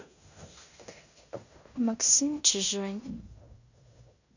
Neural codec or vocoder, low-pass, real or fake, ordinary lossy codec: codec, 16 kHz in and 24 kHz out, 0.9 kbps, LongCat-Audio-Codec, fine tuned four codebook decoder; 7.2 kHz; fake; none